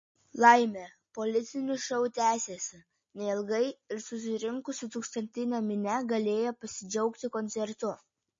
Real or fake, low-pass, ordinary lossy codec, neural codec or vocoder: real; 7.2 kHz; MP3, 32 kbps; none